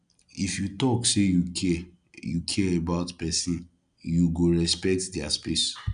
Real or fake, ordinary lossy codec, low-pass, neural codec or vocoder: real; none; 9.9 kHz; none